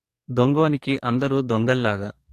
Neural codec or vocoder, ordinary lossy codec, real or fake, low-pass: codec, 44.1 kHz, 2.6 kbps, SNAC; AAC, 64 kbps; fake; 14.4 kHz